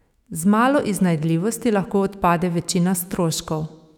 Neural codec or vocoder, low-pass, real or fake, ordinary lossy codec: autoencoder, 48 kHz, 128 numbers a frame, DAC-VAE, trained on Japanese speech; 19.8 kHz; fake; none